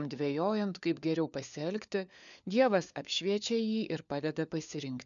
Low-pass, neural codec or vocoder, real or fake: 7.2 kHz; codec, 16 kHz, 4 kbps, FunCodec, trained on LibriTTS, 50 frames a second; fake